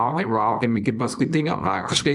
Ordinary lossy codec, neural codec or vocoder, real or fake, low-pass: MP3, 96 kbps; codec, 24 kHz, 0.9 kbps, WavTokenizer, small release; fake; 10.8 kHz